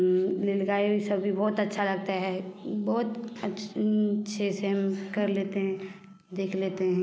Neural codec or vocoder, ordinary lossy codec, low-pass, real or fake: none; none; none; real